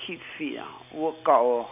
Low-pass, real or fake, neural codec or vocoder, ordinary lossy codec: 3.6 kHz; real; none; none